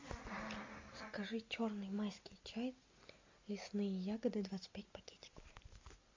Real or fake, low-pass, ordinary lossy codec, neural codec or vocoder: real; 7.2 kHz; MP3, 48 kbps; none